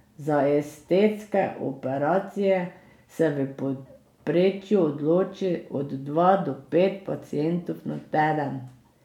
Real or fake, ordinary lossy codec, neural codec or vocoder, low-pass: real; none; none; 19.8 kHz